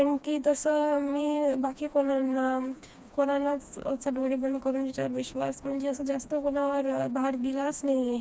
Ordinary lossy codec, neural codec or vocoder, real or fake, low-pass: none; codec, 16 kHz, 2 kbps, FreqCodec, smaller model; fake; none